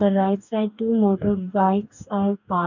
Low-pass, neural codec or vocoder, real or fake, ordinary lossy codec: 7.2 kHz; codec, 44.1 kHz, 2.6 kbps, DAC; fake; none